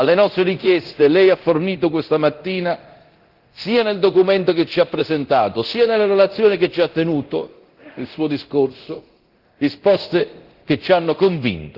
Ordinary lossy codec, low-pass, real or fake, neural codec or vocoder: Opus, 16 kbps; 5.4 kHz; fake; codec, 24 kHz, 0.9 kbps, DualCodec